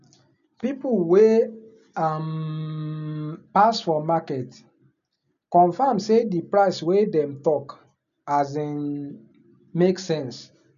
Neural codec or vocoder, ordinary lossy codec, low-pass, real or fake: none; none; 7.2 kHz; real